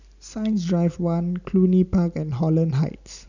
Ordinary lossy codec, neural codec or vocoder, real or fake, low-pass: none; none; real; 7.2 kHz